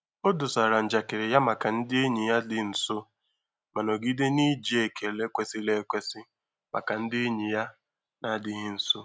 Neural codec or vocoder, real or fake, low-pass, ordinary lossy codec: none; real; none; none